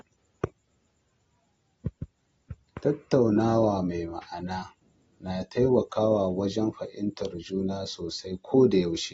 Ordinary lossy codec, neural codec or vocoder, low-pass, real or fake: AAC, 24 kbps; none; 19.8 kHz; real